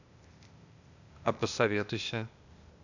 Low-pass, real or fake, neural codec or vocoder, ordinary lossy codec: 7.2 kHz; fake; codec, 16 kHz, 0.8 kbps, ZipCodec; none